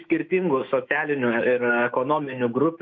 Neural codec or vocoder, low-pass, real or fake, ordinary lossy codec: autoencoder, 48 kHz, 128 numbers a frame, DAC-VAE, trained on Japanese speech; 7.2 kHz; fake; MP3, 48 kbps